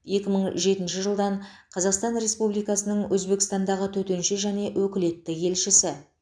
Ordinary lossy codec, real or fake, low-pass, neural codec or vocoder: none; real; 9.9 kHz; none